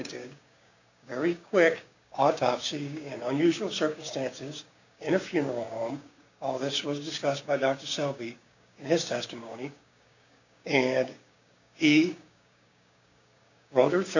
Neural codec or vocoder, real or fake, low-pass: codec, 16 kHz, 6 kbps, DAC; fake; 7.2 kHz